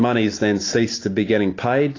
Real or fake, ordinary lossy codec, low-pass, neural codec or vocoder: real; AAC, 32 kbps; 7.2 kHz; none